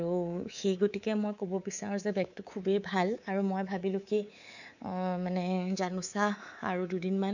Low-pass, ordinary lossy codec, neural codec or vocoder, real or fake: 7.2 kHz; none; codec, 24 kHz, 3.1 kbps, DualCodec; fake